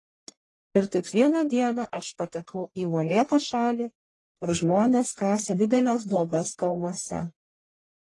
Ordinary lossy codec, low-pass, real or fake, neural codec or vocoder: AAC, 32 kbps; 10.8 kHz; fake; codec, 44.1 kHz, 1.7 kbps, Pupu-Codec